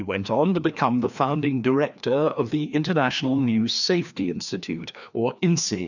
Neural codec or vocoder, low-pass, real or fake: codec, 16 kHz, 2 kbps, FreqCodec, larger model; 7.2 kHz; fake